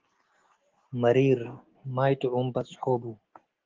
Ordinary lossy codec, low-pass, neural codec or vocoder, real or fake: Opus, 16 kbps; 7.2 kHz; none; real